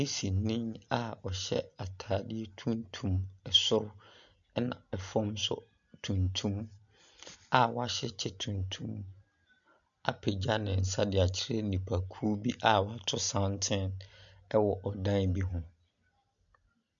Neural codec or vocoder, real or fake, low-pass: none; real; 7.2 kHz